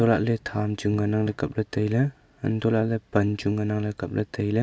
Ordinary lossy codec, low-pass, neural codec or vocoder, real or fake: none; none; none; real